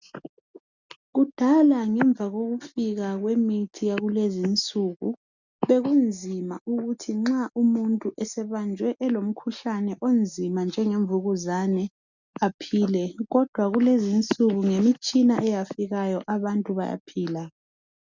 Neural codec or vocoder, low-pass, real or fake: none; 7.2 kHz; real